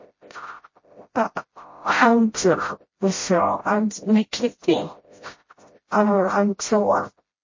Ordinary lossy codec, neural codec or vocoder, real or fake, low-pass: MP3, 32 kbps; codec, 16 kHz, 0.5 kbps, FreqCodec, smaller model; fake; 7.2 kHz